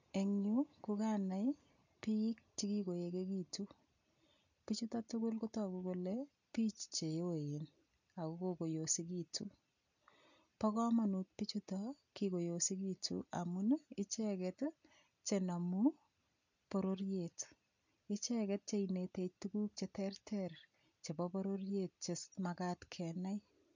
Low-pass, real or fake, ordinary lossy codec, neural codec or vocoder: 7.2 kHz; real; none; none